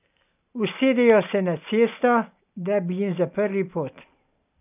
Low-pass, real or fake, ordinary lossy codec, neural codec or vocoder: 3.6 kHz; real; none; none